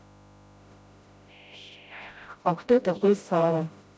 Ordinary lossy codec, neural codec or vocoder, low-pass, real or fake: none; codec, 16 kHz, 0.5 kbps, FreqCodec, smaller model; none; fake